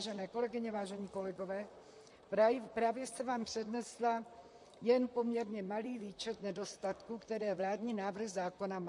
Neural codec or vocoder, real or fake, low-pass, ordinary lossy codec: vocoder, 44.1 kHz, 128 mel bands, Pupu-Vocoder; fake; 10.8 kHz; MP3, 48 kbps